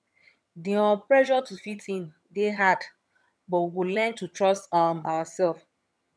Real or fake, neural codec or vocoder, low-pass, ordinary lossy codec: fake; vocoder, 22.05 kHz, 80 mel bands, HiFi-GAN; none; none